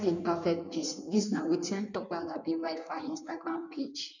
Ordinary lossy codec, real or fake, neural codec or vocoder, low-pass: none; fake; codec, 16 kHz in and 24 kHz out, 1.1 kbps, FireRedTTS-2 codec; 7.2 kHz